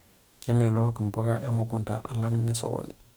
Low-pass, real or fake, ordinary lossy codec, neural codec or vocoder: none; fake; none; codec, 44.1 kHz, 2.6 kbps, DAC